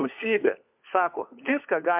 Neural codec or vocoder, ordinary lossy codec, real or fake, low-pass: codec, 16 kHz, 4 kbps, FunCodec, trained on LibriTTS, 50 frames a second; MP3, 32 kbps; fake; 3.6 kHz